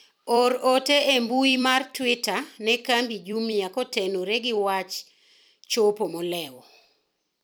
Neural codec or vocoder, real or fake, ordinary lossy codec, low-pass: vocoder, 44.1 kHz, 128 mel bands every 256 samples, BigVGAN v2; fake; none; none